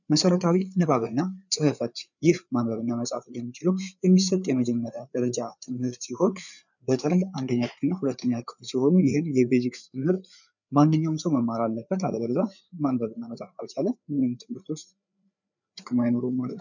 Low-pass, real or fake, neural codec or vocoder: 7.2 kHz; fake; codec, 16 kHz, 4 kbps, FreqCodec, larger model